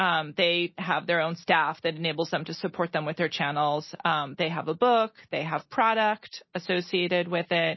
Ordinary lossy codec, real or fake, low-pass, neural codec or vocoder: MP3, 24 kbps; real; 7.2 kHz; none